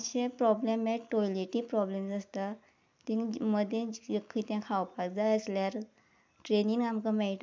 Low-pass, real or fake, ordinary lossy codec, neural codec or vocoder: none; real; none; none